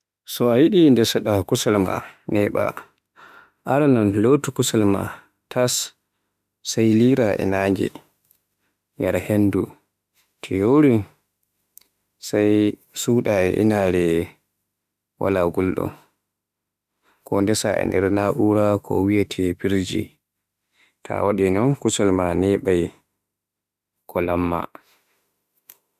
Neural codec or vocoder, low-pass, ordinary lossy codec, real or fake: autoencoder, 48 kHz, 32 numbers a frame, DAC-VAE, trained on Japanese speech; 14.4 kHz; none; fake